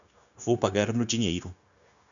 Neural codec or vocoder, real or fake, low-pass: codec, 16 kHz, 0.9 kbps, LongCat-Audio-Codec; fake; 7.2 kHz